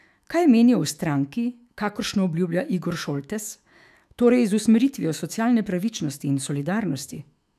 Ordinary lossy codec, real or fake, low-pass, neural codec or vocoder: none; fake; 14.4 kHz; autoencoder, 48 kHz, 128 numbers a frame, DAC-VAE, trained on Japanese speech